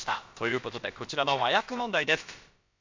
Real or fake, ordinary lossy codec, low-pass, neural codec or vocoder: fake; MP3, 64 kbps; 7.2 kHz; codec, 16 kHz, about 1 kbps, DyCAST, with the encoder's durations